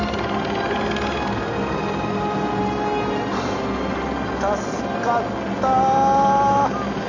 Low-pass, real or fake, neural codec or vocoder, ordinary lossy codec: 7.2 kHz; real; none; none